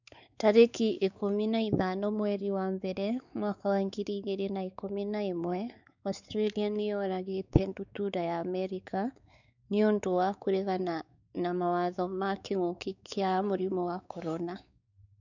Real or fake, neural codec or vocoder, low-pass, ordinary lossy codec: fake; codec, 16 kHz, 4 kbps, X-Codec, WavLM features, trained on Multilingual LibriSpeech; 7.2 kHz; none